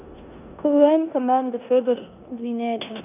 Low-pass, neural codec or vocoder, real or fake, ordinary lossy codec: 3.6 kHz; codec, 16 kHz in and 24 kHz out, 0.9 kbps, LongCat-Audio-Codec, four codebook decoder; fake; none